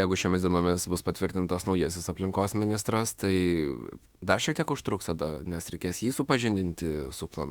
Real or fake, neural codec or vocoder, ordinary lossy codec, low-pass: fake; autoencoder, 48 kHz, 32 numbers a frame, DAC-VAE, trained on Japanese speech; Opus, 64 kbps; 19.8 kHz